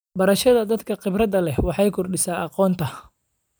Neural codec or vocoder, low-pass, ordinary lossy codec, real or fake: vocoder, 44.1 kHz, 128 mel bands every 256 samples, BigVGAN v2; none; none; fake